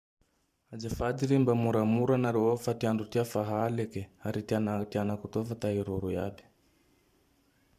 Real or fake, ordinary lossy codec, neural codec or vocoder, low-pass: fake; MP3, 96 kbps; vocoder, 44.1 kHz, 128 mel bands every 512 samples, BigVGAN v2; 14.4 kHz